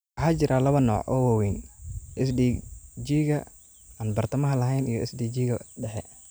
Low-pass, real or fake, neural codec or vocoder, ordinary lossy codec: none; real; none; none